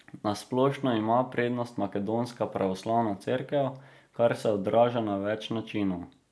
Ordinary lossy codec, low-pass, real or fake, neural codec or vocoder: none; none; real; none